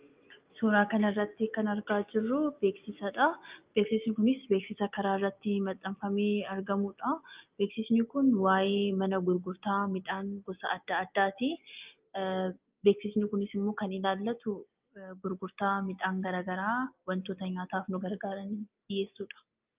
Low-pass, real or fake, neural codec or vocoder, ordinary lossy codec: 3.6 kHz; real; none; Opus, 24 kbps